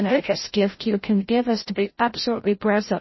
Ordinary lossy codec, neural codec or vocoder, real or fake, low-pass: MP3, 24 kbps; codec, 16 kHz, 0.5 kbps, FreqCodec, larger model; fake; 7.2 kHz